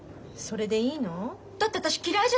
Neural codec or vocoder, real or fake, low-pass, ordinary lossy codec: none; real; none; none